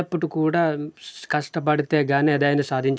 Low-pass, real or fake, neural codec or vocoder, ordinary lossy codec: none; real; none; none